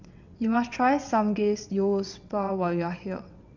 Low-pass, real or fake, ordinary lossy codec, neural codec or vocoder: 7.2 kHz; fake; none; vocoder, 22.05 kHz, 80 mel bands, WaveNeXt